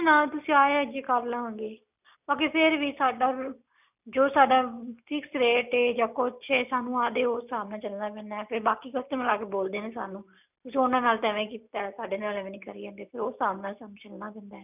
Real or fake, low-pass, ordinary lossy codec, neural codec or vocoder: real; 3.6 kHz; none; none